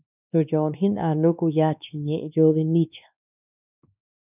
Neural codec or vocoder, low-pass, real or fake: codec, 16 kHz, 2 kbps, X-Codec, WavLM features, trained on Multilingual LibriSpeech; 3.6 kHz; fake